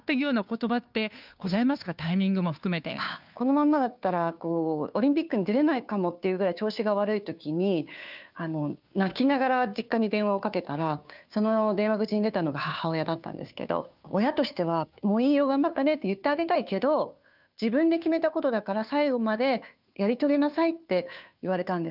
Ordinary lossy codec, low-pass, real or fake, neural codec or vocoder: none; 5.4 kHz; fake; codec, 16 kHz, 2 kbps, FunCodec, trained on Chinese and English, 25 frames a second